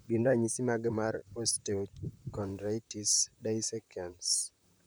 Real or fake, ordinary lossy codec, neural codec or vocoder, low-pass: fake; none; vocoder, 44.1 kHz, 128 mel bands, Pupu-Vocoder; none